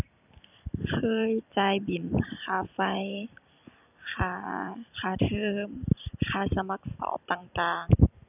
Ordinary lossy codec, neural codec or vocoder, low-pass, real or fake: none; vocoder, 44.1 kHz, 128 mel bands every 256 samples, BigVGAN v2; 3.6 kHz; fake